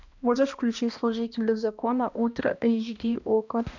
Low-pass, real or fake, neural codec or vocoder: 7.2 kHz; fake; codec, 16 kHz, 1 kbps, X-Codec, HuBERT features, trained on balanced general audio